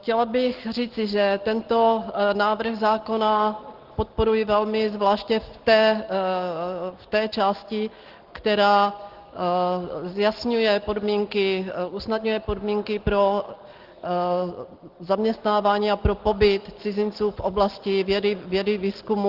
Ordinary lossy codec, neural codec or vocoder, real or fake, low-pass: Opus, 16 kbps; none; real; 5.4 kHz